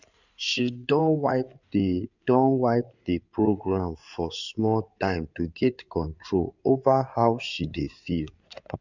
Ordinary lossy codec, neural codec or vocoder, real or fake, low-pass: none; codec, 16 kHz in and 24 kHz out, 2.2 kbps, FireRedTTS-2 codec; fake; 7.2 kHz